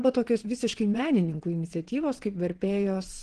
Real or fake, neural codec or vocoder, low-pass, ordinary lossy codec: fake; vocoder, 22.05 kHz, 80 mel bands, Vocos; 9.9 kHz; Opus, 16 kbps